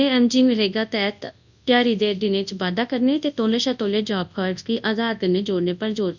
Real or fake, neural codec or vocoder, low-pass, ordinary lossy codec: fake; codec, 24 kHz, 0.9 kbps, WavTokenizer, large speech release; 7.2 kHz; none